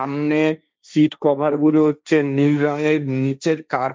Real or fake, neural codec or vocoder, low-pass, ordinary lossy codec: fake; codec, 16 kHz, 1.1 kbps, Voila-Tokenizer; none; none